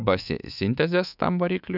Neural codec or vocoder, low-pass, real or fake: codec, 24 kHz, 3.1 kbps, DualCodec; 5.4 kHz; fake